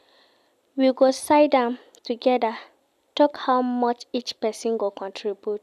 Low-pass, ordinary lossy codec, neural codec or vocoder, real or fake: 14.4 kHz; none; none; real